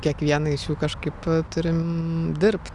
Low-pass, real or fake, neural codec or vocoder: 10.8 kHz; real; none